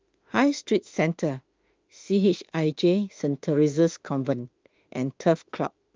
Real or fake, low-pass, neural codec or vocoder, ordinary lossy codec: fake; 7.2 kHz; autoencoder, 48 kHz, 32 numbers a frame, DAC-VAE, trained on Japanese speech; Opus, 24 kbps